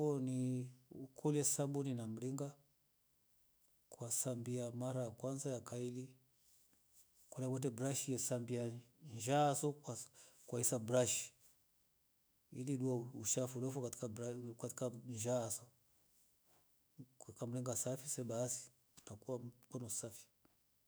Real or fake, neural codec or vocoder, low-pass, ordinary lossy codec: fake; autoencoder, 48 kHz, 128 numbers a frame, DAC-VAE, trained on Japanese speech; none; none